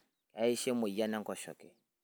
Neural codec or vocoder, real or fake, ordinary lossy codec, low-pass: none; real; none; none